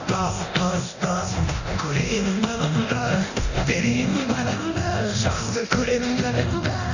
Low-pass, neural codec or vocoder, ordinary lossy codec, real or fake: 7.2 kHz; codec, 24 kHz, 0.9 kbps, DualCodec; none; fake